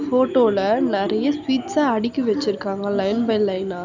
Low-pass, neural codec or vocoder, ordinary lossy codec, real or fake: 7.2 kHz; none; none; real